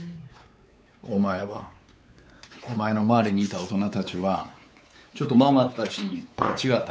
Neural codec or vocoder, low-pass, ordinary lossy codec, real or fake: codec, 16 kHz, 4 kbps, X-Codec, WavLM features, trained on Multilingual LibriSpeech; none; none; fake